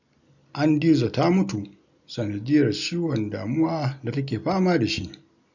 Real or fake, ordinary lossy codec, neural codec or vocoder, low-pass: real; none; none; 7.2 kHz